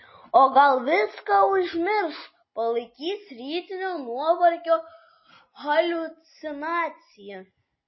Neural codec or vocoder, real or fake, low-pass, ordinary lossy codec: none; real; 7.2 kHz; MP3, 24 kbps